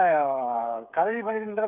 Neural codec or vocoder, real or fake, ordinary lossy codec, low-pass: codec, 16 kHz, 8 kbps, FreqCodec, smaller model; fake; none; 3.6 kHz